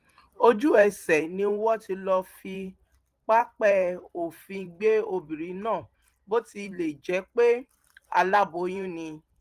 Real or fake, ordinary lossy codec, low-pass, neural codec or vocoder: fake; Opus, 24 kbps; 14.4 kHz; vocoder, 44.1 kHz, 128 mel bands every 512 samples, BigVGAN v2